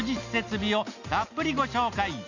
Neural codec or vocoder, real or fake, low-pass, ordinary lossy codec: none; real; 7.2 kHz; none